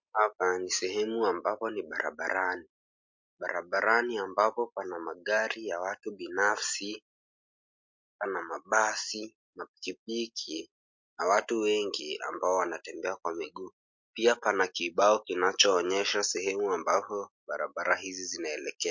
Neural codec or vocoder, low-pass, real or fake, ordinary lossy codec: none; 7.2 kHz; real; MP3, 48 kbps